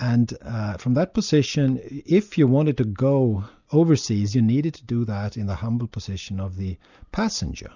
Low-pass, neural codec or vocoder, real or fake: 7.2 kHz; none; real